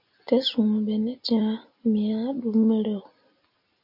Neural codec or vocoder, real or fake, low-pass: none; real; 5.4 kHz